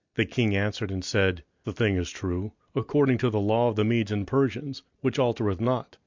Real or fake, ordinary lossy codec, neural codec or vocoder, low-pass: real; MP3, 64 kbps; none; 7.2 kHz